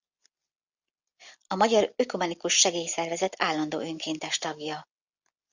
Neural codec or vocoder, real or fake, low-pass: none; real; 7.2 kHz